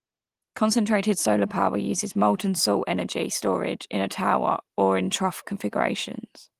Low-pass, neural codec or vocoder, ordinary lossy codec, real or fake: 14.4 kHz; none; Opus, 24 kbps; real